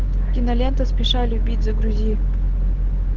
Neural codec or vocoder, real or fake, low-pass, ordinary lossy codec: none; real; 7.2 kHz; Opus, 16 kbps